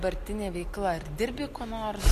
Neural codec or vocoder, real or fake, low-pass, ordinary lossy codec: none; real; 14.4 kHz; AAC, 64 kbps